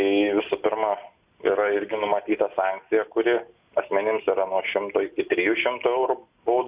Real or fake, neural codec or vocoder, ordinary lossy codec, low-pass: real; none; Opus, 64 kbps; 3.6 kHz